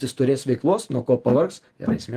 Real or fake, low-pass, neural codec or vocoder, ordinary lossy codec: fake; 14.4 kHz; vocoder, 48 kHz, 128 mel bands, Vocos; Opus, 24 kbps